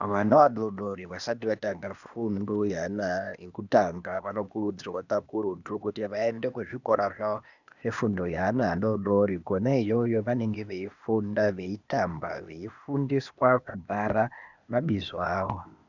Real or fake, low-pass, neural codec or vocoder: fake; 7.2 kHz; codec, 16 kHz, 0.8 kbps, ZipCodec